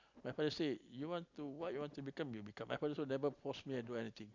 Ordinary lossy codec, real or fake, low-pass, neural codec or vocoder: none; real; 7.2 kHz; none